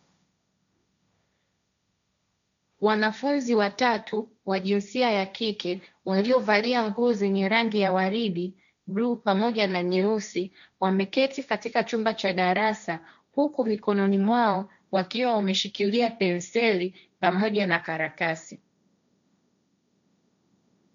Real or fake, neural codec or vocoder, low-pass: fake; codec, 16 kHz, 1.1 kbps, Voila-Tokenizer; 7.2 kHz